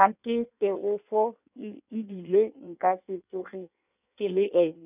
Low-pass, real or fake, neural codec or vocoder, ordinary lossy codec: 3.6 kHz; fake; codec, 16 kHz in and 24 kHz out, 1.1 kbps, FireRedTTS-2 codec; none